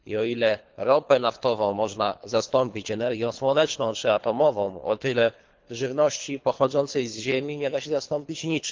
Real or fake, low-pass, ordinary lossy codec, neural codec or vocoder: fake; 7.2 kHz; Opus, 32 kbps; codec, 24 kHz, 3 kbps, HILCodec